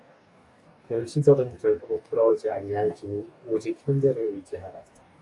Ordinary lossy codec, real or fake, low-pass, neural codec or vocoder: AAC, 64 kbps; fake; 10.8 kHz; codec, 44.1 kHz, 2.6 kbps, DAC